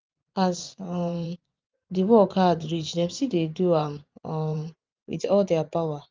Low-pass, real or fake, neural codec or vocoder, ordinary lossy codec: 7.2 kHz; fake; vocoder, 24 kHz, 100 mel bands, Vocos; Opus, 24 kbps